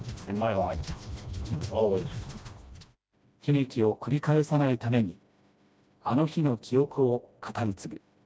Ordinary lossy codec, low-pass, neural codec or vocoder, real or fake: none; none; codec, 16 kHz, 1 kbps, FreqCodec, smaller model; fake